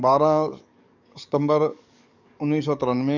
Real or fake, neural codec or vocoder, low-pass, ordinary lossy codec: fake; codec, 44.1 kHz, 7.8 kbps, DAC; 7.2 kHz; none